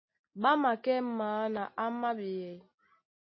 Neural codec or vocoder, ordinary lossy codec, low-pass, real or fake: none; MP3, 24 kbps; 7.2 kHz; real